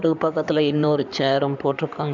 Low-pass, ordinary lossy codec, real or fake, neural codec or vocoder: 7.2 kHz; none; fake; codec, 16 kHz in and 24 kHz out, 2.2 kbps, FireRedTTS-2 codec